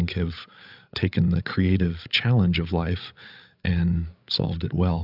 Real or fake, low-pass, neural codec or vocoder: fake; 5.4 kHz; codec, 16 kHz, 8 kbps, FreqCodec, larger model